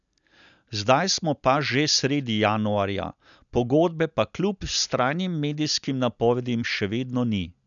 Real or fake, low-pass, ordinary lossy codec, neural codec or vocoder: real; 7.2 kHz; none; none